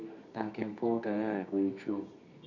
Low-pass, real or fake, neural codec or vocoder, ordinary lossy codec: 7.2 kHz; fake; codec, 24 kHz, 0.9 kbps, WavTokenizer, medium music audio release; none